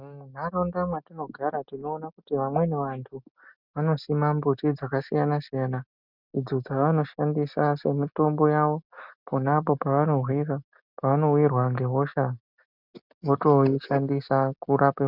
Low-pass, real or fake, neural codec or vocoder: 5.4 kHz; real; none